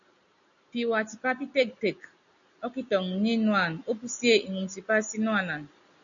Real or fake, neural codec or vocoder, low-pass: real; none; 7.2 kHz